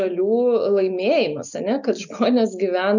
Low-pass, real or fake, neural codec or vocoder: 7.2 kHz; real; none